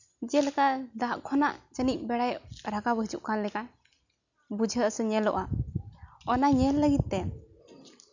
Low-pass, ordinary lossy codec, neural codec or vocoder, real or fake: 7.2 kHz; none; none; real